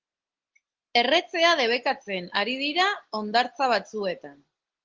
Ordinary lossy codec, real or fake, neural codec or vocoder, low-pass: Opus, 16 kbps; real; none; 7.2 kHz